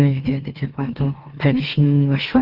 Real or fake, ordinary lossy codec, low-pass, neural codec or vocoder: fake; Opus, 16 kbps; 5.4 kHz; autoencoder, 44.1 kHz, a latent of 192 numbers a frame, MeloTTS